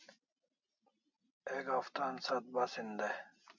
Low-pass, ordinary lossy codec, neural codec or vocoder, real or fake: 7.2 kHz; MP3, 64 kbps; none; real